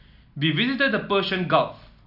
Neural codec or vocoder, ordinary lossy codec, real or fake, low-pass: none; none; real; 5.4 kHz